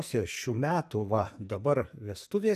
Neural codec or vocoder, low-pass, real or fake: codec, 44.1 kHz, 2.6 kbps, SNAC; 14.4 kHz; fake